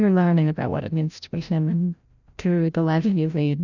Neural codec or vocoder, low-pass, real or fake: codec, 16 kHz, 0.5 kbps, FreqCodec, larger model; 7.2 kHz; fake